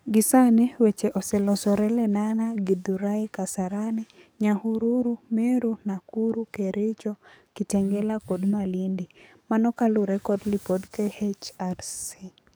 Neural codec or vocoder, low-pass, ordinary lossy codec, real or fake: codec, 44.1 kHz, 7.8 kbps, DAC; none; none; fake